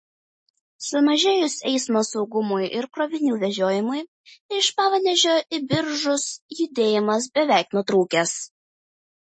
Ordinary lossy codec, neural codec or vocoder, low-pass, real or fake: MP3, 32 kbps; none; 9.9 kHz; real